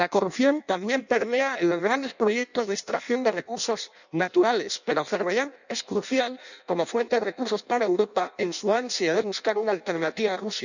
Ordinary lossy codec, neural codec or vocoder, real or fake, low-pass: none; codec, 16 kHz in and 24 kHz out, 0.6 kbps, FireRedTTS-2 codec; fake; 7.2 kHz